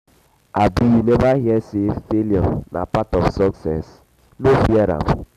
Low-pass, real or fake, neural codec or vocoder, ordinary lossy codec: 14.4 kHz; real; none; none